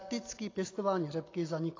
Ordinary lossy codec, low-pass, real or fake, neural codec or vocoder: AAC, 32 kbps; 7.2 kHz; real; none